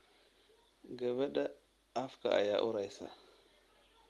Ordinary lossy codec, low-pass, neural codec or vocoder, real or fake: Opus, 24 kbps; 19.8 kHz; none; real